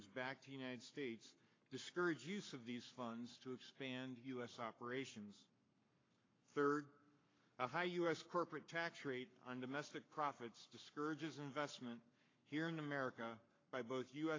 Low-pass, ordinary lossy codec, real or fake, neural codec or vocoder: 7.2 kHz; AAC, 32 kbps; fake; codec, 44.1 kHz, 7.8 kbps, Pupu-Codec